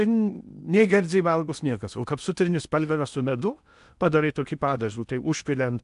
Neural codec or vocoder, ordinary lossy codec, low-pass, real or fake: codec, 16 kHz in and 24 kHz out, 0.8 kbps, FocalCodec, streaming, 65536 codes; MP3, 96 kbps; 10.8 kHz; fake